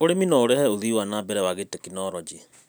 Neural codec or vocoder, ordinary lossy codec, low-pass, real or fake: none; none; none; real